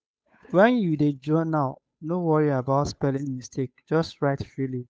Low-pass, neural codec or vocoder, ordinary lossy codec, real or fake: none; codec, 16 kHz, 8 kbps, FunCodec, trained on Chinese and English, 25 frames a second; none; fake